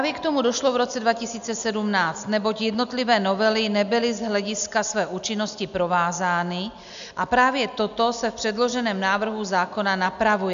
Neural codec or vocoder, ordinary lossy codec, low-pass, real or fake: none; AAC, 96 kbps; 7.2 kHz; real